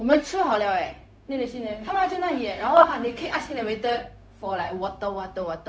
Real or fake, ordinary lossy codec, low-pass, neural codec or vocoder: fake; none; none; codec, 16 kHz, 0.4 kbps, LongCat-Audio-Codec